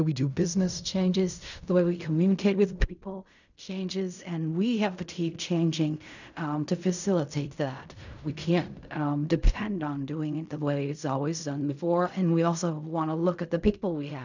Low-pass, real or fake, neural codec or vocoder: 7.2 kHz; fake; codec, 16 kHz in and 24 kHz out, 0.4 kbps, LongCat-Audio-Codec, fine tuned four codebook decoder